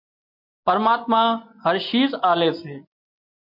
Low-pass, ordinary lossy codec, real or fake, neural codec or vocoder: 5.4 kHz; MP3, 48 kbps; real; none